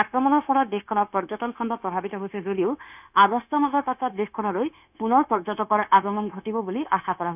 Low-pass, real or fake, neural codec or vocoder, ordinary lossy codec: 3.6 kHz; fake; codec, 16 kHz, 0.9 kbps, LongCat-Audio-Codec; none